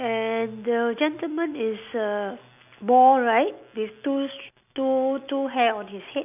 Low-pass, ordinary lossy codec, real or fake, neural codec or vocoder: 3.6 kHz; none; real; none